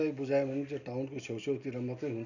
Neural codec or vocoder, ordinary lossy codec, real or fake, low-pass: none; none; real; 7.2 kHz